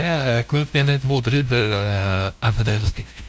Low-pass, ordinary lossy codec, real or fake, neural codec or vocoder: none; none; fake; codec, 16 kHz, 0.5 kbps, FunCodec, trained on LibriTTS, 25 frames a second